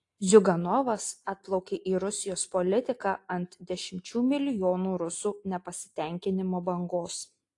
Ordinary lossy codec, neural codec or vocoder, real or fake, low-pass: AAC, 48 kbps; none; real; 10.8 kHz